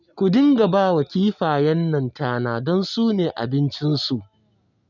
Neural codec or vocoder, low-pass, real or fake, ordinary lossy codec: none; 7.2 kHz; real; none